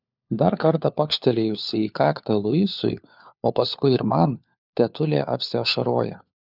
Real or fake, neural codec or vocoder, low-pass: fake; codec, 16 kHz, 4 kbps, FunCodec, trained on LibriTTS, 50 frames a second; 5.4 kHz